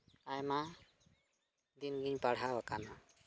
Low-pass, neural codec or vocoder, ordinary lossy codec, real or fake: none; none; none; real